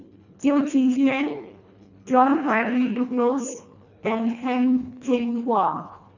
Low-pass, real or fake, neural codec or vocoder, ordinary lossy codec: 7.2 kHz; fake; codec, 24 kHz, 1.5 kbps, HILCodec; none